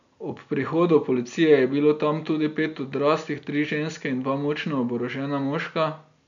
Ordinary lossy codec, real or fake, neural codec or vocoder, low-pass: none; real; none; 7.2 kHz